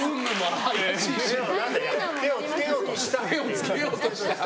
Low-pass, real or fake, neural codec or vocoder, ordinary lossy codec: none; real; none; none